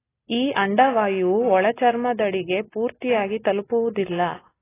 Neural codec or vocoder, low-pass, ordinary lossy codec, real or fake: none; 3.6 kHz; AAC, 16 kbps; real